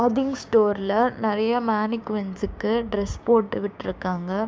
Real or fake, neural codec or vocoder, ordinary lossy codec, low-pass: fake; codec, 16 kHz, 6 kbps, DAC; none; none